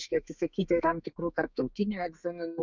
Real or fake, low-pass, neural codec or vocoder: fake; 7.2 kHz; codec, 32 kHz, 1.9 kbps, SNAC